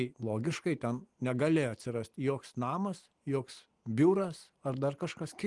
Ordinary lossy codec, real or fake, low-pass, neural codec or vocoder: Opus, 24 kbps; real; 10.8 kHz; none